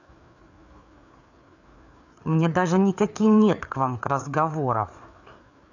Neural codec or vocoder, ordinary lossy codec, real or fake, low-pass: codec, 16 kHz, 4 kbps, FreqCodec, larger model; none; fake; 7.2 kHz